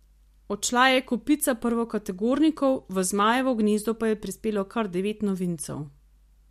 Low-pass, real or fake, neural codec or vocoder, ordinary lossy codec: 14.4 kHz; real; none; MP3, 64 kbps